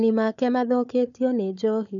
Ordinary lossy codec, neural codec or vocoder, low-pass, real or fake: none; codec, 16 kHz, 4 kbps, FunCodec, trained on Chinese and English, 50 frames a second; 7.2 kHz; fake